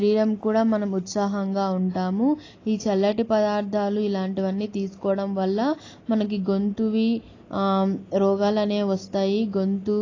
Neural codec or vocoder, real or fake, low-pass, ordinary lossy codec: none; real; 7.2 kHz; AAC, 32 kbps